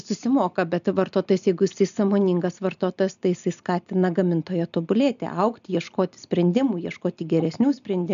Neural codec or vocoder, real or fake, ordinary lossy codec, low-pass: none; real; MP3, 96 kbps; 7.2 kHz